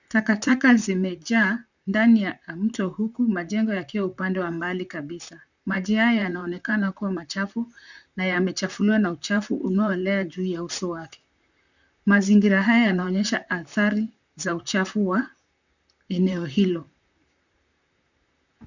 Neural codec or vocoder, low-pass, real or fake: vocoder, 44.1 kHz, 128 mel bands, Pupu-Vocoder; 7.2 kHz; fake